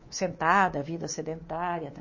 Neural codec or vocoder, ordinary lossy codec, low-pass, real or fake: codec, 24 kHz, 3.1 kbps, DualCodec; MP3, 32 kbps; 7.2 kHz; fake